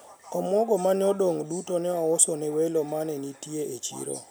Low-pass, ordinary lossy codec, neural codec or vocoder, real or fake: none; none; none; real